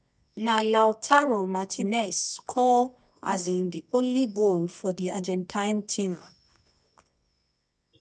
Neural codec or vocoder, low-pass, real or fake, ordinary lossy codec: codec, 24 kHz, 0.9 kbps, WavTokenizer, medium music audio release; 10.8 kHz; fake; none